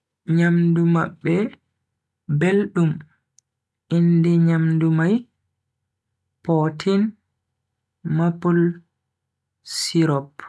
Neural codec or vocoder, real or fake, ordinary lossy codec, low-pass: none; real; none; none